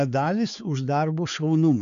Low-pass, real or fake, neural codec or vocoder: 7.2 kHz; fake; codec, 16 kHz, 4 kbps, X-Codec, HuBERT features, trained on balanced general audio